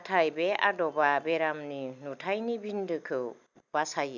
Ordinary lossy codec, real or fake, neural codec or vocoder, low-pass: none; real; none; 7.2 kHz